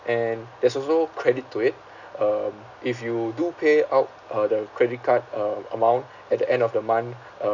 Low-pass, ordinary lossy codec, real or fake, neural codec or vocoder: 7.2 kHz; none; real; none